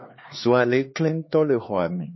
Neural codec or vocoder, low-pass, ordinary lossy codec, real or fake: codec, 16 kHz, 4 kbps, X-Codec, HuBERT features, trained on LibriSpeech; 7.2 kHz; MP3, 24 kbps; fake